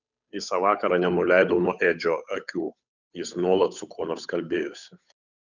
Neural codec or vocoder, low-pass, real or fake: codec, 16 kHz, 8 kbps, FunCodec, trained on Chinese and English, 25 frames a second; 7.2 kHz; fake